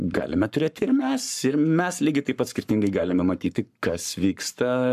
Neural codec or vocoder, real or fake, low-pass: codec, 44.1 kHz, 7.8 kbps, Pupu-Codec; fake; 14.4 kHz